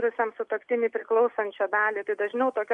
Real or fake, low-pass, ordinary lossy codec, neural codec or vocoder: real; 10.8 kHz; MP3, 64 kbps; none